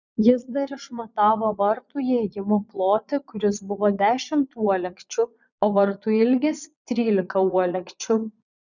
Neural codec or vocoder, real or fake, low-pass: vocoder, 22.05 kHz, 80 mel bands, Vocos; fake; 7.2 kHz